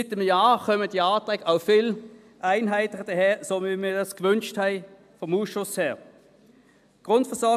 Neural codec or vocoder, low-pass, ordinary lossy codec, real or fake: none; 14.4 kHz; AAC, 96 kbps; real